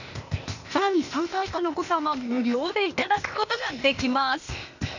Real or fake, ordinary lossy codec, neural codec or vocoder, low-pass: fake; none; codec, 16 kHz, 0.8 kbps, ZipCodec; 7.2 kHz